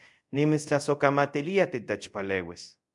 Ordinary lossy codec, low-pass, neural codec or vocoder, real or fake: MP3, 64 kbps; 10.8 kHz; codec, 24 kHz, 0.5 kbps, DualCodec; fake